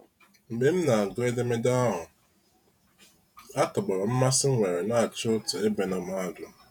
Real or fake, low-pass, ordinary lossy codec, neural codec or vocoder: fake; none; none; vocoder, 48 kHz, 128 mel bands, Vocos